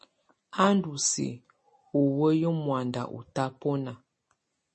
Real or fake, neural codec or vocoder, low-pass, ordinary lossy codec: real; none; 10.8 kHz; MP3, 32 kbps